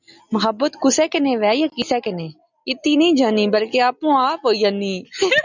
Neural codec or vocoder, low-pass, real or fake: none; 7.2 kHz; real